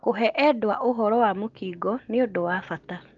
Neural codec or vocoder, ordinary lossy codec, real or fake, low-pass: none; Opus, 32 kbps; real; 7.2 kHz